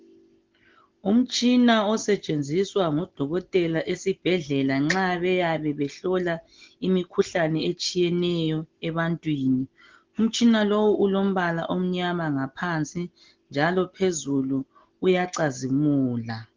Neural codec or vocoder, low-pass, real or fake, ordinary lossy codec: none; 7.2 kHz; real; Opus, 16 kbps